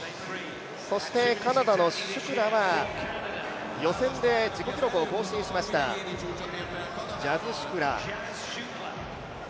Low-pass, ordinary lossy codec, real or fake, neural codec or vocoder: none; none; real; none